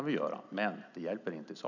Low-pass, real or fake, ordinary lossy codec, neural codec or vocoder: 7.2 kHz; real; none; none